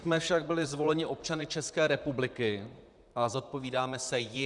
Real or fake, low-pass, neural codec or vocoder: fake; 10.8 kHz; vocoder, 44.1 kHz, 128 mel bands, Pupu-Vocoder